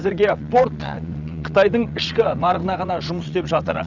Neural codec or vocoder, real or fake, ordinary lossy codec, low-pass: vocoder, 22.05 kHz, 80 mel bands, WaveNeXt; fake; none; 7.2 kHz